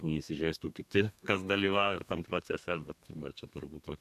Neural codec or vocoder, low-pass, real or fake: codec, 32 kHz, 1.9 kbps, SNAC; 14.4 kHz; fake